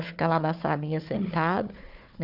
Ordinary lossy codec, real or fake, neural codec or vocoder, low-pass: none; fake; codec, 16 kHz, 4 kbps, FunCodec, trained on Chinese and English, 50 frames a second; 5.4 kHz